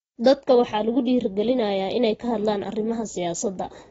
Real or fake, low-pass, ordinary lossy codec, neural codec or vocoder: real; 19.8 kHz; AAC, 24 kbps; none